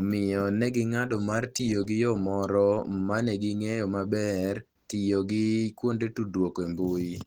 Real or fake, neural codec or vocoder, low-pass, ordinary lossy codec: real; none; 19.8 kHz; Opus, 16 kbps